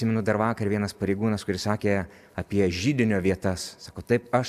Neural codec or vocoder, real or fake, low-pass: none; real; 14.4 kHz